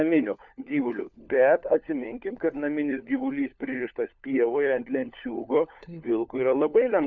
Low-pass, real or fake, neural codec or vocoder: 7.2 kHz; fake; codec, 16 kHz, 4 kbps, FunCodec, trained on LibriTTS, 50 frames a second